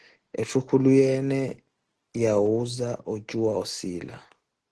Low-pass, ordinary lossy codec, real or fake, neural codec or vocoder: 10.8 kHz; Opus, 16 kbps; real; none